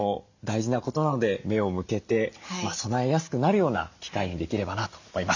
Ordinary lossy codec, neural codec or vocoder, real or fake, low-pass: none; none; real; 7.2 kHz